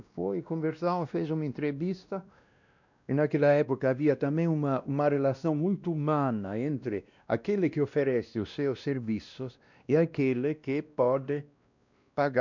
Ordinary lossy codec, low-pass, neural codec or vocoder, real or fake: none; 7.2 kHz; codec, 16 kHz, 1 kbps, X-Codec, WavLM features, trained on Multilingual LibriSpeech; fake